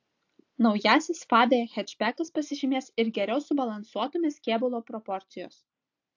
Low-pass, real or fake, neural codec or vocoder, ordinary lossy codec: 7.2 kHz; real; none; AAC, 48 kbps